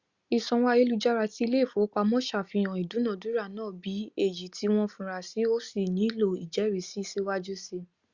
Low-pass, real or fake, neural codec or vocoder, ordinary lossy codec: 7.2 kHz; real; none; Opus, 64 kbps